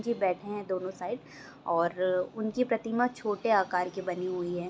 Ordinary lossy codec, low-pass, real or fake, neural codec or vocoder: none; none; real; none